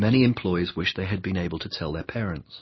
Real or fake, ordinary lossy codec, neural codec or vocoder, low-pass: real; MP3, 24 kbps; none; 7.2 kHz